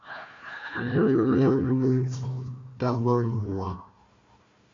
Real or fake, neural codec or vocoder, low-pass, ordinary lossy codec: fake; codec, 16 kHz, 1 kbps, FunCodec, trained on Chinese and English, 50 frames a second; 7.2 kHz; MP3, 48 kbps